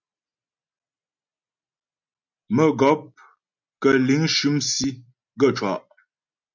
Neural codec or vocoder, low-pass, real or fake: none; 7.2 kHz; real